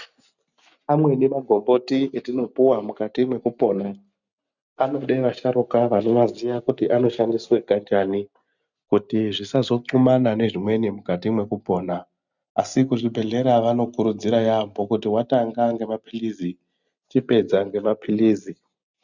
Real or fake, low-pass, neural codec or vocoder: real; 7.2 kHz; none